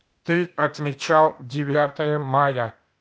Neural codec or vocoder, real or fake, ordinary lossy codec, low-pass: codec, 16 kHz, 0.8 kbps, ZipCodec; fake; none; none